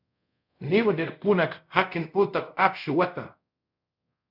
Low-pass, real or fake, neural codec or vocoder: 5.4 kHz; fake; codec, 24 kHz, 0.5 kbps, DualCodec